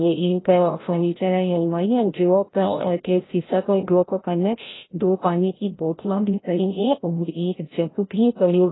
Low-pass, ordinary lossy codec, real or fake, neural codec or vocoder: 7.2 kHz; AAC, 16 kbps; fake; codec, 16 kHz, 0.5 kbps, FreqCodec, larger model